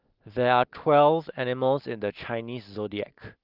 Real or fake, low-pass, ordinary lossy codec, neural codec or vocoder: real; 5.4 kHz; Opus, 32 kbps; none